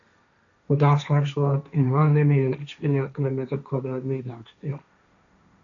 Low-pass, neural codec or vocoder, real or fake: 7.2 kHz; codec, 16 kHz, 1.1 kbps, Voila-Tokenizer; fake